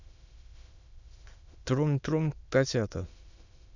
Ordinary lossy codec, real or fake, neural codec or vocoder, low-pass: none; fake; autoencoder, 22.05 kHz, a latent of 192 numbers a frame, VITS, trained on many speakers; 7.2 kHz